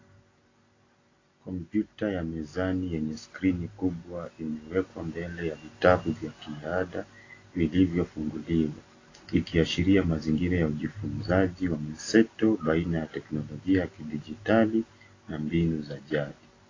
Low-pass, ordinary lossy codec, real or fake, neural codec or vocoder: 7.2 kHz; AAC, 32 kbps; real; none